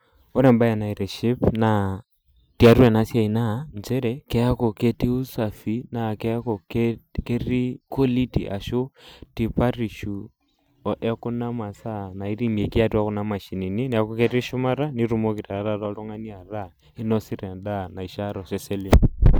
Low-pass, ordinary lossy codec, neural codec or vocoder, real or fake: none; none; none; real